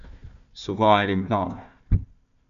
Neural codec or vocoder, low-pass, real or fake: codec, 16 kHz, 1 kbps, FunCodec, trained on Chinese and English, 50 frames a second; 7.2 kHz; fake